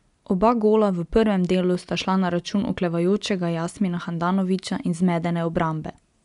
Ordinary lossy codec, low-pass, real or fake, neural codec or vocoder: none; 10.8 kHz; real; none